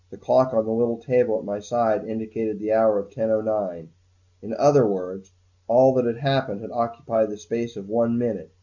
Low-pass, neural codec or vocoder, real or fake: 7.2 kHz; none; real